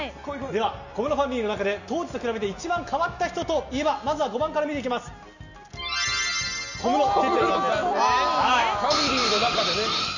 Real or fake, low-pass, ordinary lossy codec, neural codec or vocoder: real; 7.2 kHz; none; none